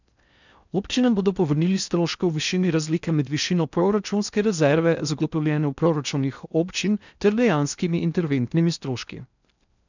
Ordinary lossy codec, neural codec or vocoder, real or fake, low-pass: none; codec, 16 kHz in and 24 kHz out, 0.6 kbps, FocalCodec, streaming, 4096 codes; fake; 7.2 kHz